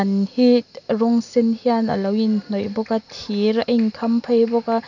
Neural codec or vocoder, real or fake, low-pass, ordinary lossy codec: none; real; 7.2 kHz; none